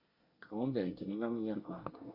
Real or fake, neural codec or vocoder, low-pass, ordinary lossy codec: fake; codec, 24 kHz, 1 kbps, SNAC; 5.4 kHz; Opus, 64 kbps